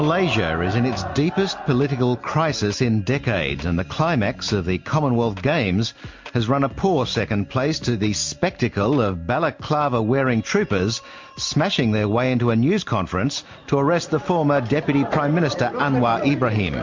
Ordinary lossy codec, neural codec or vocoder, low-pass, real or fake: MP3, 48 kbps; none; 7.2 kHz; real